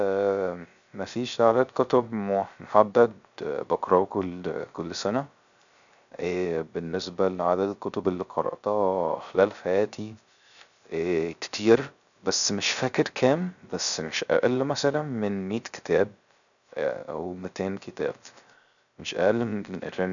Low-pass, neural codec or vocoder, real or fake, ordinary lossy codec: 7.2 kHz; codec, 16 kHz, 0.3 kbps, FocalCodec; fake; none